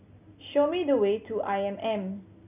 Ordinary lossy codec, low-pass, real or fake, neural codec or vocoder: none; 3.6 kHz; real; none